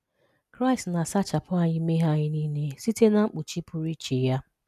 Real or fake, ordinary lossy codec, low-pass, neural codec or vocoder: real; none; 14.4 kHz; none